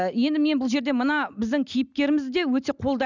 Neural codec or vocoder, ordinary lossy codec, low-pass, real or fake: none; none; 7.2 kHz; real